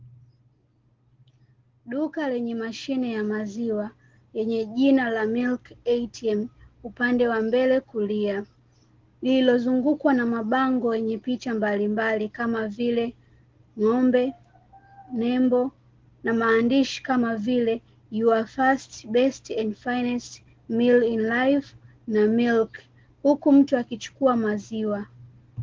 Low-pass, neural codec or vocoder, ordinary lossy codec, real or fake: 7.2 kHz; none; Opus, 16 kbps; real